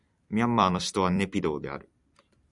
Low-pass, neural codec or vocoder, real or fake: 10.8 kHz; vocoder, 44.1 kHz, 128 mel bands every 256 samples, BigVGAN v2; fake